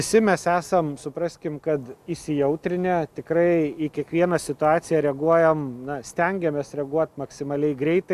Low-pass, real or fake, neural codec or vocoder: 14.4 kHz; real; none